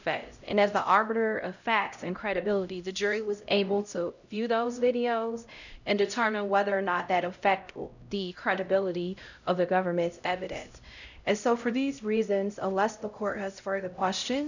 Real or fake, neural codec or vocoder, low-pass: fake; codec, 16 kHz, 0.5 kbps, X-Codec, HuBERT features, trained on LibriSpeech; 7.2 kHz